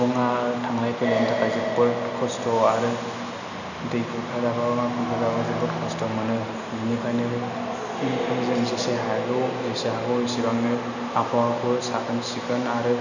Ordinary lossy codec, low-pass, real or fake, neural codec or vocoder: none; 7.2 kHz; real; none